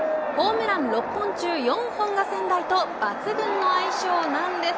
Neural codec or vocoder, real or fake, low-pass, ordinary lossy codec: none; real; none; none